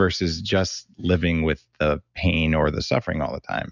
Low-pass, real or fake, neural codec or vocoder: 7.2 kHz; real; none